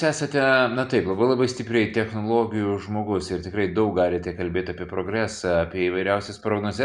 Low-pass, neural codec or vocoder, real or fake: 10.8 kHz; none; real